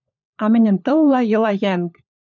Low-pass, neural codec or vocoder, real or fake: 7.2 kHz; codec, 16 kHz, 4 kbps, FunCodec, trained on LibriTTS, 50 frames a second; fake